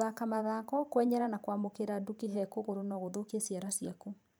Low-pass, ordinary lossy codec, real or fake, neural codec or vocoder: none; none; fake; vocoder, 44.1 kHz, 128 mel bands every 512 samples, BigVGAN v2